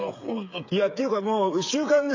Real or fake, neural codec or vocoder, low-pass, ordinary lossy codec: fake; codec, 16 kHz, 8 kbps, FreqCodec, smaller model; 7.2 kHz; AAC, 48 kbps